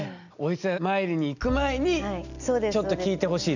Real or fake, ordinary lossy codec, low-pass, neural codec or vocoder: real; none; 7.2 kHz; none